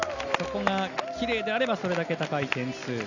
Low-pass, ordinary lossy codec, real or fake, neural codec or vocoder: 7.2 kHz; none; real; none